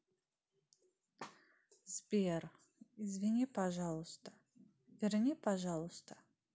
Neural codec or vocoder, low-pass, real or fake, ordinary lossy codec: none; none; real; none